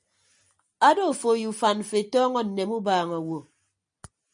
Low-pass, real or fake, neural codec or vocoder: 9.9 kHz; real; none